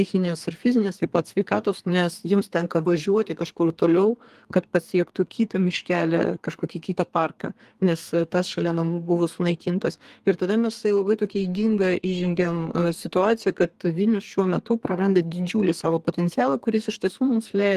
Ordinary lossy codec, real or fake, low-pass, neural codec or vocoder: Opus, 24 kbps; fake; 14.4 kHz; codec, 32 kHz, 1.9 kbps, SNAC